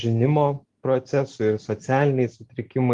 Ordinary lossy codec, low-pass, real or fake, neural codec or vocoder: Opus, 24 kbps; 10.8 kHz; real; none